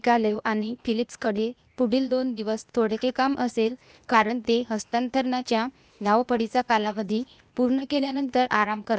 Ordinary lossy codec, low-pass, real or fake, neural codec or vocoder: none; none; fake; codec, 16 kHz, 0.8 kbps, ZipCodec